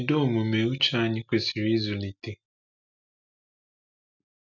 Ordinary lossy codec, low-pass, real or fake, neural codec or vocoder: none; 7.2 kHz; real; none